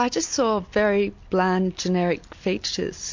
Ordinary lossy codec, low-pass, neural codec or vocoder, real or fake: MP3, 48 kbps; 7.2 kHz; none; real